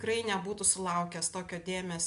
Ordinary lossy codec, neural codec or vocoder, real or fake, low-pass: MP3, 64 kbps; none; real; 10.8 kHz